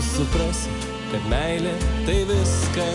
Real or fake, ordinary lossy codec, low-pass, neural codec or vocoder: real; MP3, 64 kbps; 10.8 kHz; none